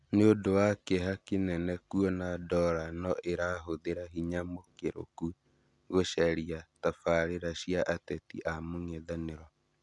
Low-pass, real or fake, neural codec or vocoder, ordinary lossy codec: 10.8 kHz; real; none; none